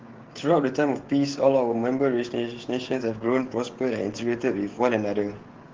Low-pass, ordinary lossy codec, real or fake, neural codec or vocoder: 7.2 kHz; Opus, 16 kbps; fake; codec, 16 kHz, 16 kbps, FreqCodec, smaller model